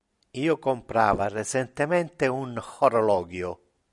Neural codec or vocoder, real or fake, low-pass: none; real; 10.8 kHz